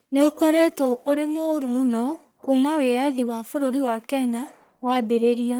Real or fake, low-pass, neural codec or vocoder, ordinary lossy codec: fake; none; codec, 44.1 kHz, 1.7 kbps, Pupu-Codec; none